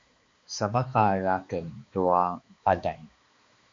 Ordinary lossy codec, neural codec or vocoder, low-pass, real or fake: MP3, 48 kbps; codec, 16 kHz, 2 kbps, X-Codec, HuBERT features, trained on balanced general audio; 7.2 kHz; fake